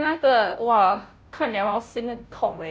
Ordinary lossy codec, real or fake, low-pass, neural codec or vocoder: none; fake; none; codec, 16 kHz, 0.5 kbps, FunCodec, trained on Chinese and English, 25 frames a second